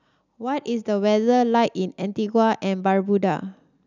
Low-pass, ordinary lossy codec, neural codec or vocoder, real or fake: 7.2 kHz; none; none; real